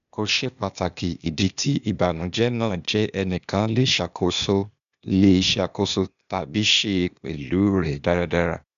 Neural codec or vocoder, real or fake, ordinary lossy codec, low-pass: codec, 16 kHz, 0.8 kbps, ZipCodec; fake; none; 7.2 kHz